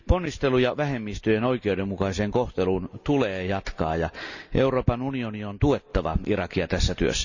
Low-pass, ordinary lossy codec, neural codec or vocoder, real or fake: 7.2 kHz; none; none; real